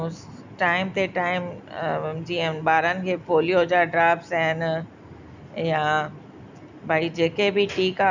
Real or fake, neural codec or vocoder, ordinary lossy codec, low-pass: real; none; none; 7.2 kHz